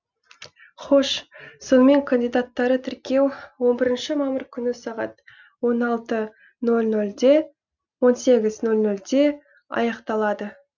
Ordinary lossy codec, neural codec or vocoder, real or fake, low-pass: none; none; real; 7.2 kHz